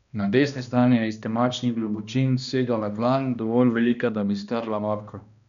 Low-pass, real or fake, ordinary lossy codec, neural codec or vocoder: 7.2 kHz; fake; none; codec, 16 kHz, 1 kbps, X-Codec, HuBERT features, trained on balanced general audio